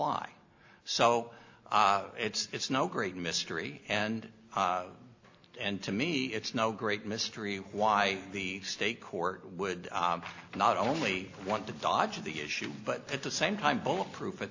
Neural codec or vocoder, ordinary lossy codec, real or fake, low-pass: none; AAC, 48 kbps; real; 7.2 kHz